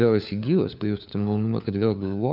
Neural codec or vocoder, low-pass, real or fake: codec, 16 kHz, 4 kbps, FunCodec, trained on LibriTTS, 50 frames a second; 5.4 kHz; fake